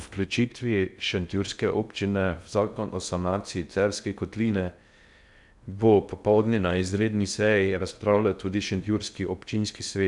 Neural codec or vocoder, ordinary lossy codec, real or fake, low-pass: codec, 16 kHz in and 24 kHz out, 0.6 kbps, FocalCodec, streaming, 2048 codes; none; fake; 10.8 kHz